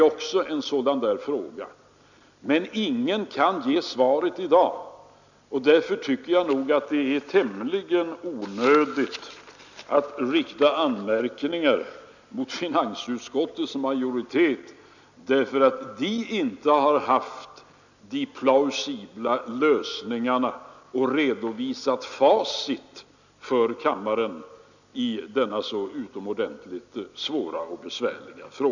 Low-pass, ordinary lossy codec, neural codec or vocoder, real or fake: 7.2 kHz; none; none; real